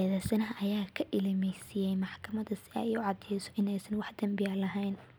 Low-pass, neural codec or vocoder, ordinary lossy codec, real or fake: none; none; none; real